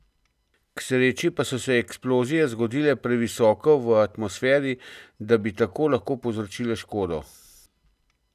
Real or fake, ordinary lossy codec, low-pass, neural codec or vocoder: real; none; 14.4 kHz; none